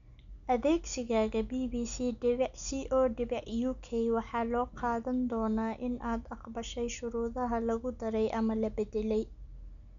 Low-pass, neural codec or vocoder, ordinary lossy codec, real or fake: 7.2 kHz; none; none; real